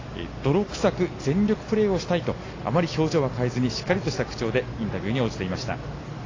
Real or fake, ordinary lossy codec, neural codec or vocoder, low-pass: real; AAC, 32 kbps; none; 7.2 kHz